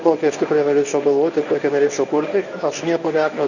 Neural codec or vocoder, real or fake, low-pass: codec, 24 kHz, 0.9 kbps, WavTokenizer, medium speech release version 1; fake; 7.2 kHz